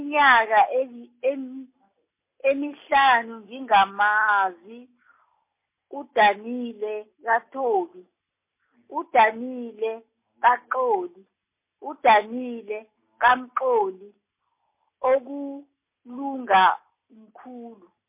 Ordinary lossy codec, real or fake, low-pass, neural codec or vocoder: MP3, 24 kbps; real; 3.6 kHz; none